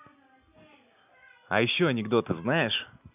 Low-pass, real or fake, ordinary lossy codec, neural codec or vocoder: 3.6 kHz; real; none; none